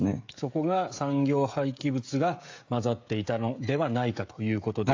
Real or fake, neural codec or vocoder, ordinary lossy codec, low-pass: fake; codec, 16 kHz, 16 kbps, FreqCodec, smaller model; AAC, 48 kbps; 7.2 kHz